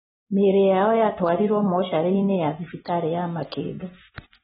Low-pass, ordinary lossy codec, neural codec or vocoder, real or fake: 14.4 kHz; AAC, 16 kbps; none; real